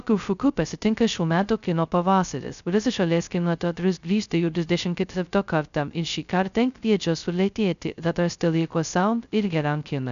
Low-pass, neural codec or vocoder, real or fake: 7.2 kHz; codec, 16 kHz, 0.2 kbps, FocalCodec; fake